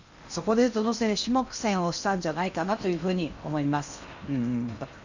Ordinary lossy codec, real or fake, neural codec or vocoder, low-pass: none; fake; codec, 16 kHz in and 24 kHz out, 0.8 kbps, FocalCodec, streaming, 65536 codes; 7.2 kHz